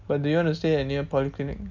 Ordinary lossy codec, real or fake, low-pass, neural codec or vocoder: MP3, 64 kbps; real; 7.2 kHz; none